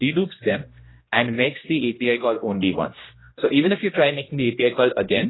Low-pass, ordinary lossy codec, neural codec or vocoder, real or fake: 7.2 kHz; AAC, 16 kbps; codec, 16 kHz, 1 kbps, X-Codec, HuBERT features, trained on general audio; fake